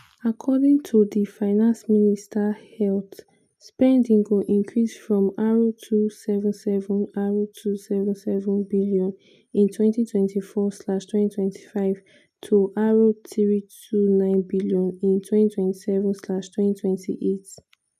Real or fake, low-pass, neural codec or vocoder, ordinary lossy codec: real; 14.4 kHz; none; none